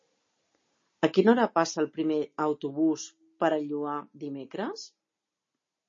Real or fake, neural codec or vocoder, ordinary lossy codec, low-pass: real; none; MP3, 32 kbps; 7.2 kHz